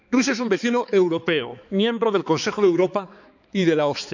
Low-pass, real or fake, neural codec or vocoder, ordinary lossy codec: 7.2 kHz; fake; codec, 16 kHz, 4 kbps, X-Codec, HuBERT features, trained on balanced general audio; none